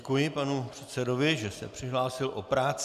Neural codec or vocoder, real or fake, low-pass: none; real; 14.4 kHz